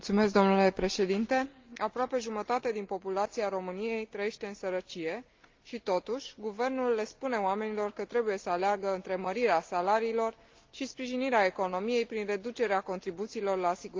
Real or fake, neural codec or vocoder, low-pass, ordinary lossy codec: real; none; 7.2 kHz; Opus, 32 kbps